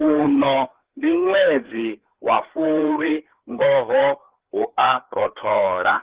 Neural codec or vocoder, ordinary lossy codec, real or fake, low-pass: codec, 16 kHz, 4 kbps, FreqCodec, larger model; Opus, 16 kbps; fake; 3.6 kHz